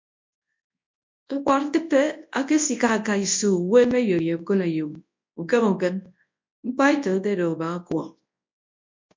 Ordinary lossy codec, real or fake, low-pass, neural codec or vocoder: MP3, 48 kbps; fake; 7.2 kHz; codec, 24 kHz, 0.9 kbps, WavTokenizer, large speech release